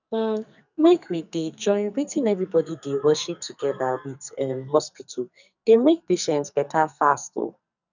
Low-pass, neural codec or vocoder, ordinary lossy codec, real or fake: 7.2 kHz; codec, 44.1 kHz, 2.6 kbps, SNAC; none; fake